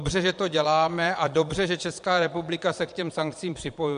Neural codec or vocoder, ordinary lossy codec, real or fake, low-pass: vocoder, 22.05 kHz, 80 mel bands, Vocos; MP3, 64 kbps; fake; 9.9 kHz